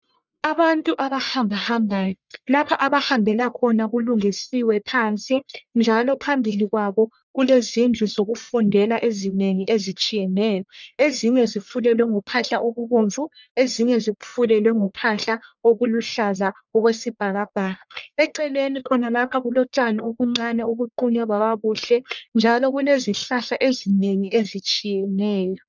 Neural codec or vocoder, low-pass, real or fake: codec, 44.1 kHz, 1.7 kbps, Pupu-Codec; 7.2 kHz; fake